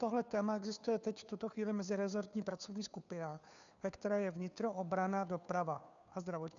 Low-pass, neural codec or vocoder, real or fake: 7.2 kHz; codec, 16 kHz, 2 kbps, FunCodec, trained on Chinese and English, 25 frames a second; fake